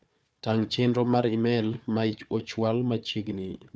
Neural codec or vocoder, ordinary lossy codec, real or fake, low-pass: codec, 16 kHz, 4 kbps, FunCodec, trained on Chinese and English, 50 frames a second; none; fake; none